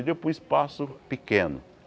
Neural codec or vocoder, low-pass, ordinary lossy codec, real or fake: none; none; none; real